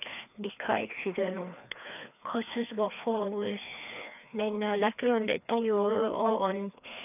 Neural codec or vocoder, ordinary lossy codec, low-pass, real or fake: codec, 16 kHz, 2 kbps, FreqCodec, larger model; none; 3.6 kHz; fake